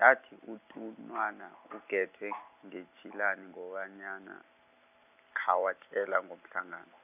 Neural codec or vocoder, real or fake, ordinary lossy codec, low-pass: none; real; none; 3.6 kHz